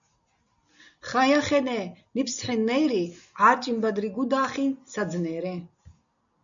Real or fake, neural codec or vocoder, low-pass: real; none; 7.2 kHz